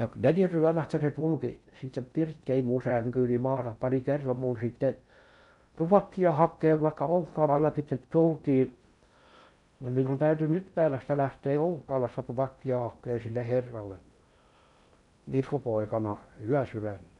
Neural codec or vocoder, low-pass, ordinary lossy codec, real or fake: codec, 16 kHz in and 24 kHz out, 0.6 kbps, FocalCodec, streaming, 4096 codes; 10.8 kHz; none; fake